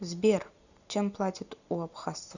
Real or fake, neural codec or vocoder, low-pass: real; none; 7.2 kHz